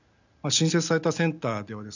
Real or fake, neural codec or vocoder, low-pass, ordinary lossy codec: real; none; 7.2 kHz; none